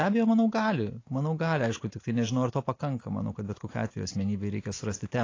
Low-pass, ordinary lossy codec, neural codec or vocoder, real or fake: 7.2 kHz; AAC, 32 kbps; none; real